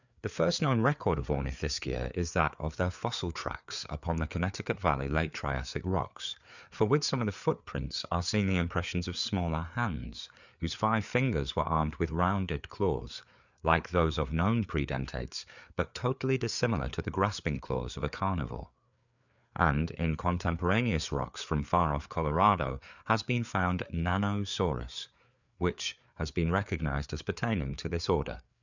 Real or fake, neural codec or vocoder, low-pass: fake; codec, 16 kHz, 4 kbps, FreqCodec, larger model; 7.2 kHz